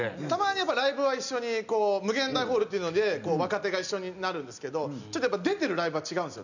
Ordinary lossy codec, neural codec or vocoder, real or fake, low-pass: none; none; real; 7.2 kHz